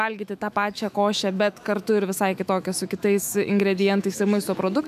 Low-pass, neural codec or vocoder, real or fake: 14.4 kHz; autoencoder, 48 kHz, 128 numbers a frame, DAC-VAE, trained on Japanese speech; fake